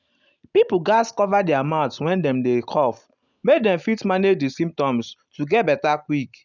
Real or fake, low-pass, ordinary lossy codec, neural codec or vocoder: real; 7.2 kHz; none; none